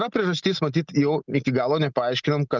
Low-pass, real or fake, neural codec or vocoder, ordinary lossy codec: 7.2 kHz; real; none; Opus, 32 kbps